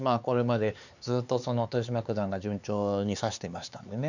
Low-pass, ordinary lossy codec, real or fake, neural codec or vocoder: 7.2 kHz; none; fake; codec, 16 kHz, 4 kbps, X-Codec, HuBERT features, trained on LibriSpeech